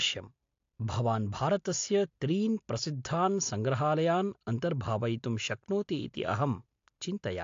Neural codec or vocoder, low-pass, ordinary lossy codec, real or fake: none; 7.2 kHz; AAC, 48 kbps; real